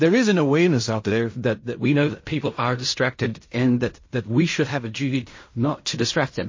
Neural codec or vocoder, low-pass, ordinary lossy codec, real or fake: codec, 16 kHz in and 24 kHz out, 0.4 kbps, LongCat-Audio-Codec, fine tuned four codebook decoder; 7.2 kHz; MP3, 32 kbps; fake